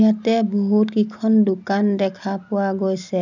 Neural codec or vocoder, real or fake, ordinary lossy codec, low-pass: none; real; none; 7.2 kHz